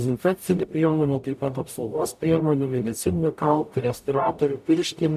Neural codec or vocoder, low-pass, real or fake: codec, 44.1 kHz, 0.9 kbps, DAC; 14.4 kHz; fake